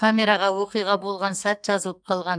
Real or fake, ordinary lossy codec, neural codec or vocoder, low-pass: fake; none; codec, 44.1 kHz, 2.6 kbps, SNAC; 9.9 kHz